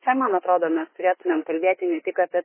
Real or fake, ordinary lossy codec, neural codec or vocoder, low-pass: fake; MP3, 16 kbps; autoencoder, 48 kHz, 32 numbers a frame, DAC-VAE, trained on Japanese speech; 3.6 kHz